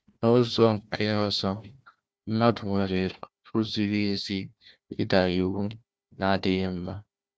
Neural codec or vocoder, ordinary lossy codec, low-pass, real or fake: codec, 16 kHz, 1 kbps, FunCodec, trained on Chinese and English, 50 frames a second; none; none; fake